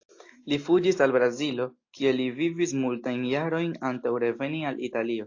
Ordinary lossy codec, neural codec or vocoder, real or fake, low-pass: AAC, 48 kbps; none; real; 7.2 kHz